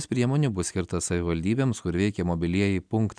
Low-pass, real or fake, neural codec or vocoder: 9.9 kHz; real; none